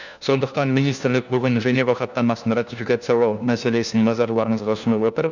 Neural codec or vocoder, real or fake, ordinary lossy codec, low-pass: codec, 16 kHz, 1 kbps, FunCodec, trained on LibriTTS, 50 frames a second; fake; none; 7.2 kHz